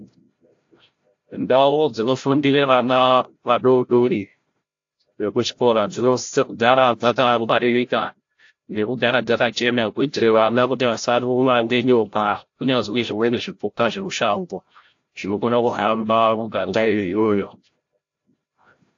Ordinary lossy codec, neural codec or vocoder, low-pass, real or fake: AAC, 48 kbps; codec, 16 kHz, 0.5 kbps, FreqCodec, larger model; 7.2 kHz; fake